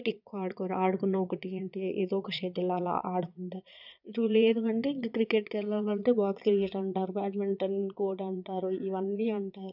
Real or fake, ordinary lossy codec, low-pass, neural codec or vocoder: fake; none; 5.4 kHz; vocoder, 22.05 kHz, 80 mel bands, Vocos